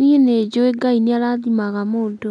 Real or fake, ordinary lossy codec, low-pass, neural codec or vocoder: real; MP3, 96 kbps; 10.8 kHz; none